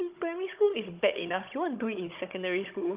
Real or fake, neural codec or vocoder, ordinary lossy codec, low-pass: fake; codec, 16 kHz, 16 kbps, FunCodec, trained on Chinese and English, 50 frames a second; Opus, 32 kbps; 3.6 kHz